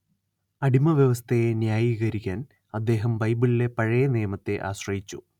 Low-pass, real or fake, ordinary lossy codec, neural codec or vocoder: 19.8 kHz; real; none; none